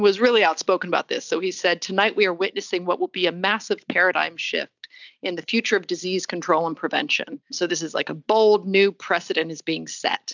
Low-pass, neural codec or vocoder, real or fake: 7.2 kHz; none; real